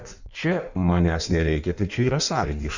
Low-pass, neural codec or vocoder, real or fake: 7.2 kHz; codec, 16 kHz in and 24 kHz out, 1.1 kbps, FireRedTTS-2 codec; fake